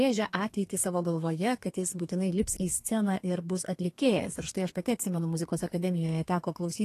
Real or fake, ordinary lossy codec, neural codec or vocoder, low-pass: fake; AAC, 48 kbps; codec, 32 kHz, 1.9 kbps, SNAC; 14.4 kHz